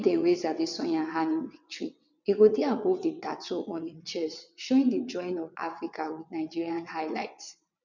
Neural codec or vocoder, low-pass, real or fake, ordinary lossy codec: vocoder, 22.05 kHz, 80 mel bands, WaveNeXt; 7.2 kHz; fake; none